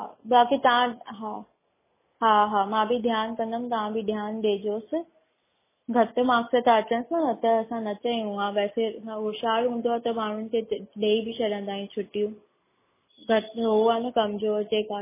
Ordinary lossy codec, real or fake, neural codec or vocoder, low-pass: MP3, 16 kbps; real; none; 3.6 kHz